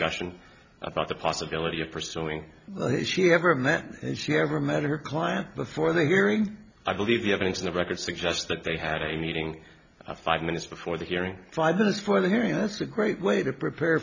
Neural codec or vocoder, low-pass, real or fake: none; 7.2 kHz; real